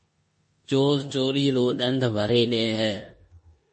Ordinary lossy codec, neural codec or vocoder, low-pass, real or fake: MP3, 32 kbps; codec, 16 kHz in and 24 kHz out, 0.9 kbps, LongCat-Audio-Codec, four codebook decoder; 10.8 kHz; fake